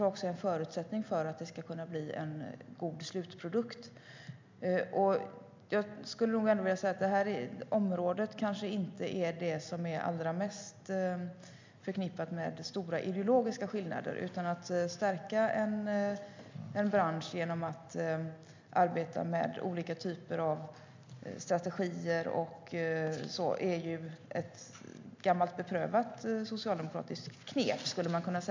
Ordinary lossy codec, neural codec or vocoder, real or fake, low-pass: MP3, 64 kbps; none; real; 7.2 kHz